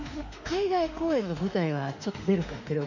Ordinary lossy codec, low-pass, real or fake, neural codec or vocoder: none; 7.2 kHz; fake; autoencoder, 48 kHz, 32 numbers a frame, DAC-VAE, trained on Japanese speech